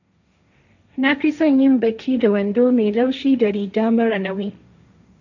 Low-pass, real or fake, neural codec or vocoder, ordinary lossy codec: 7.2 kHz; fake; codec, 16 kHz, 1.1 kbps, Voila-Tokenizer; none